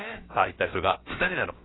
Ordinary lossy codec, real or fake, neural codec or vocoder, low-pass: AAC, 16 kbps; fake; codec, 16 kHz, about 1 kbps, DyCAST, with the encoder's durations; 7.2 kHz